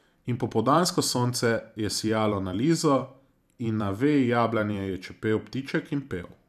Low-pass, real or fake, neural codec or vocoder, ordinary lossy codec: 14.4 kHz; fake; vocoder, 44.1 kHz, 128 mel bands every 512 samples, BigVGAN v2; none